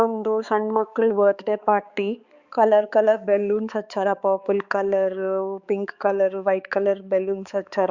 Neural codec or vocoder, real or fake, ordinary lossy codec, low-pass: codec, 16 kHz, 4 kbps, X-Codec, HuBERT features, trained on balanced general audio; fake; Opus, 64 kbps; 7.2 kHz